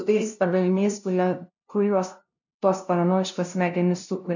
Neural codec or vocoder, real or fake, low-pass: codec, 16 kHz, 0.5 kbps, FunCodec, trained on LibriTTS, 25 frames a second; fake; 7.2 kHz